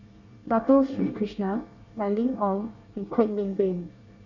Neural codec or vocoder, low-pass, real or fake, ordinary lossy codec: codec, 24 kHz, 1 kbps, SNAC; 7.2 kHz; fake; none